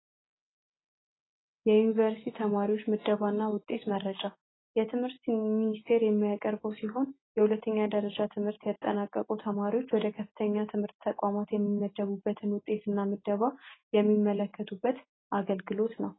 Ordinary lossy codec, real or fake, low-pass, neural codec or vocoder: AAC, 16 kbps; real; 7.2 kHz; none